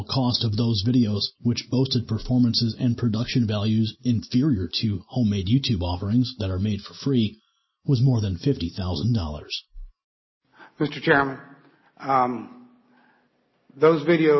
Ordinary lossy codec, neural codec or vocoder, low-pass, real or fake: MP3, 24 kbps; none; 7.2 kHz; real